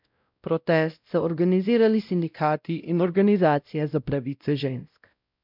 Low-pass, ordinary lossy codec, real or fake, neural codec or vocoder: 5.4 kHz; none; fake; codec, 16 kHz, 0.5 kbps, X-Codec, WavLM features, trained on Multilingual LibriSpeech